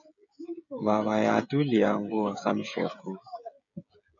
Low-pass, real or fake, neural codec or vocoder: 7.2 kHz; fake; codec, 16 kHz, 16 kbps, FreqCodec, smaller model